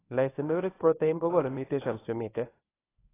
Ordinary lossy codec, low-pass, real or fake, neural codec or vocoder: AAC, 16 kbps; 3.6 kHz; fake; codec, 24 kHz, 0.9 kbps, WavTokenizer, medium speech release version 1